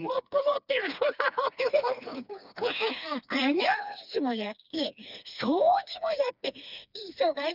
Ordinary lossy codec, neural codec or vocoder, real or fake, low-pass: none; codec, 16 kHz, 2 kbps, FreqCodec, smaller model; fake; 5.4 kHz